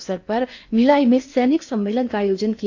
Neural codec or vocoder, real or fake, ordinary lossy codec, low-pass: codec, 16 kHz in and 24 kHz out, 0.8 kbps, FocalCodec, streaming, 65536 codes; fake; AAC, 48 kbps; 7.2 kHz